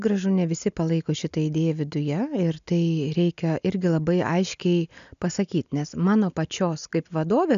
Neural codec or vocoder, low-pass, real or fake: none; 7.2 kHz; real